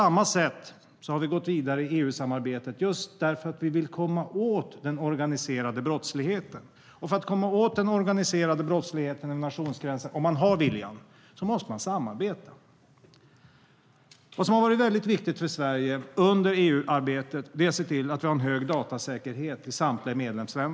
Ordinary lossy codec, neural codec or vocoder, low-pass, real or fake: none; none; none; real